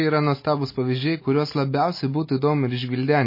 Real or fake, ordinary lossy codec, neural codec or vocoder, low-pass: real; MP3, 24 kbps; none; 5.4 kHz